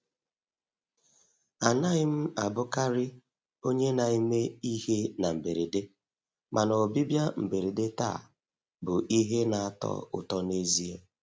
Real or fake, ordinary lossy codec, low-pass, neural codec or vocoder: real; none; none; none